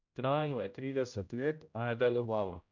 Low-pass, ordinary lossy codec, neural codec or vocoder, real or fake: 7.2 kHz; none; codec, 16 kHz, 0.5 kbps, X-Codec, HuBERT features, trained on general audio; fake